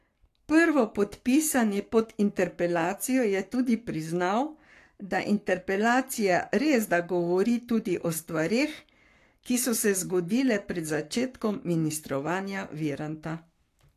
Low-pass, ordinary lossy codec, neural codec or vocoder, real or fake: 14.4 kHz; AAC, 64 kbps; codec, 44.1 kHz, 7.8 kbps, Pupu-Codec; fake